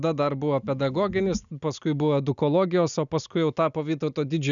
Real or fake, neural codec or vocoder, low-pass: real; none; 7.2 kHz